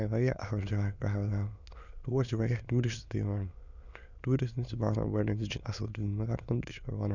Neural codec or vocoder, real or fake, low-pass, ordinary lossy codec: autoencoder, 22.05 kHz, a latent of 192 numbers a frame, VITS, trained on many speakers; fake; 7.2 kHz; none